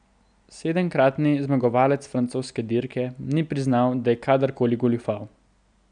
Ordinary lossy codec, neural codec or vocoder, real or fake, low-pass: none; none; real; 9.9 kHz